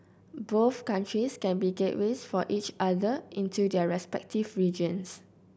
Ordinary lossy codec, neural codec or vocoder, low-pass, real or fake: none; none; none; real